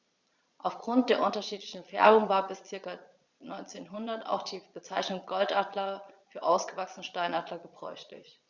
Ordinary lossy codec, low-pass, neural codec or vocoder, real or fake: Opus, 64 kbps; 7.2 kHz; vocoder, 22.05 kHz, 80 mel bands, Vocos; fake